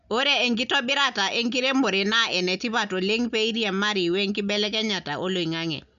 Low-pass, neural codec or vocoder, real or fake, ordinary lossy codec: 7.2 kHz; none; real; none